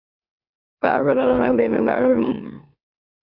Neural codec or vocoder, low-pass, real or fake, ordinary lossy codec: autoencoder, 44.1 kHz, a latent of 192 numbers a frame, MeloTTS; 5.4 kHz; fake; Opus, 64 kbps